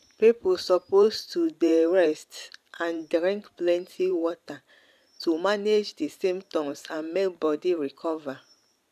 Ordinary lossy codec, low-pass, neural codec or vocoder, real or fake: none; 14.4 kHz; vocoder, 44.1 kHz, 128 mel bands every 512 samples, BigVGAN v2; fake